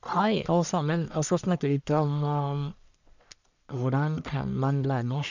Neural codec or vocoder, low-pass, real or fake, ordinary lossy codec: codec, 44.1 kHz, 1.7 kbps, Pupu-Codec; 7.2 kHz; fake; none